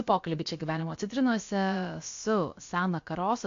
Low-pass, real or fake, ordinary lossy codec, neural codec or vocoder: 7.2 kHz; fake; AAC, 48 kbps; codec, 16 kHz, about 1 kbps, DyCAST, with the encoder's durations